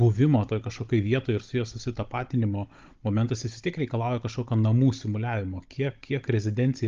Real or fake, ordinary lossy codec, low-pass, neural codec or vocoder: fake; Opus, 32 kbps; 7.2 kHz; codec, 16 kHz, 16 kbps, FunCodec, trained on Chinese and English, 50 frames a second